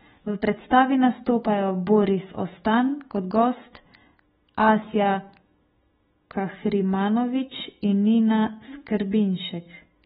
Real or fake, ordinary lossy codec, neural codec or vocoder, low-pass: fake; AAC, 16 kbps; autoencoder, 48 kHz, 128 numbers a frame, DAC-VAE, trained on Japanese speech; 19.8 kHz